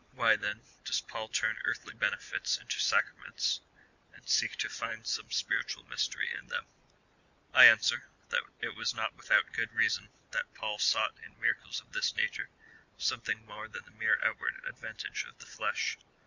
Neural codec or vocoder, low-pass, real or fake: none; 7.2 kHz; real